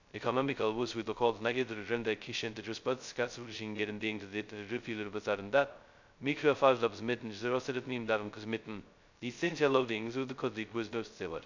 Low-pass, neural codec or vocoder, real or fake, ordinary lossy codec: 7.2 kHz; codec, 16 kHz, 0.2 kbps, FocalCodec; fake; none